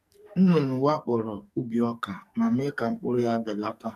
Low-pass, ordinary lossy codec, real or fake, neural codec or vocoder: 14.4 kHz; none; fake; codec, 44.1 kHz, 2.6 kbps, SNAC